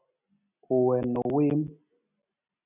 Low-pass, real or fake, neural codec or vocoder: 3.6 kHz; real; none